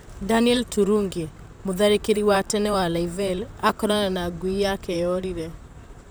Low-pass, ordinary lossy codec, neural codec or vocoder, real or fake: none; none; vocoder, 44.1 kHz, 128 mel bands, Pupu-Vocoder; fake